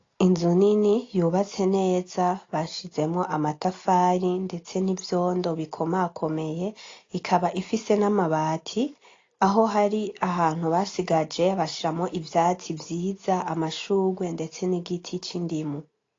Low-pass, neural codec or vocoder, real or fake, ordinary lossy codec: 7.2 kHz; none; real; AAC, 32 kbps